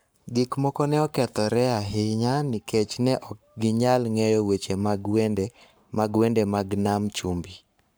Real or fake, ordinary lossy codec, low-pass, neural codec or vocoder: fake; none; none; codec, 44.1 kHz, 7.8 kbps, Pupu-Codec